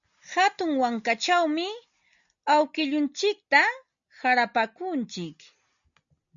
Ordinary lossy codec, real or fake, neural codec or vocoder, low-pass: MP3, 96 kbps; real; none; 7.2 kHz